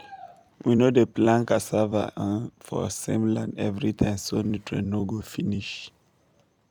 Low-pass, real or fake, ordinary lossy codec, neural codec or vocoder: 19.8 kHz; fake; none; vocoder, 44.1 kHz, 128 mel bands every 256 samples, BigVGAN v2